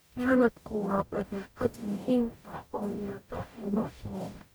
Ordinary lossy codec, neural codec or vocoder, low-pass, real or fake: none; codec, 44.1 kHz, 0.9 kbps, DAC; none; fake